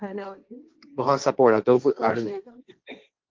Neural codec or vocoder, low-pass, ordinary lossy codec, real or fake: codec, 16 kHz, 1.1 kbps, Voila-Tokenizer; 7.2 kHz; Opus, 32 kbps; fake